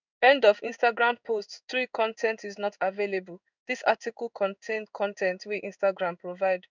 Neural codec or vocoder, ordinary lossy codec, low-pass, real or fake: codec, 16 kHz in and 24 kHz out, 1 kbps, XY-Tokenizer; none; 7.2 kHz; fake